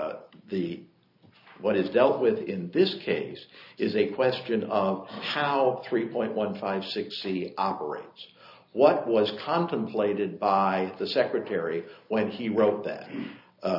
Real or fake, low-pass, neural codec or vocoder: real; 5.4 kHz; none